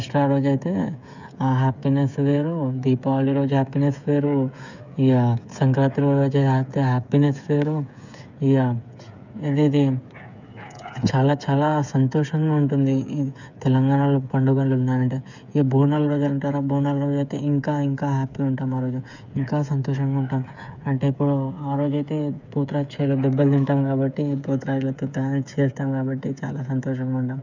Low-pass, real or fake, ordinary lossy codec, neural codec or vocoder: 7.2 kHz; fake; none; codec, 16 kHz, 8 kbps, FreqCodec, smaller model